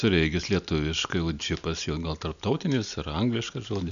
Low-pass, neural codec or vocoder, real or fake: 7.2 kHz; none; real